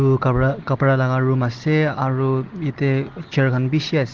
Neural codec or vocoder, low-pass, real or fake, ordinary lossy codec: none; 7.2 kHz; real; Opus, 16 kbps